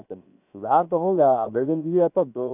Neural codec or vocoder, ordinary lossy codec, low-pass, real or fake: codec, 16 kHz, 0.7 kbps, FocalCodec; none; 3.6 kHz; fake